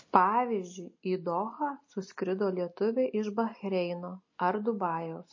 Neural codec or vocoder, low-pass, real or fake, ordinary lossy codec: none; 7.2 kHz; real; MP3, 32 kbps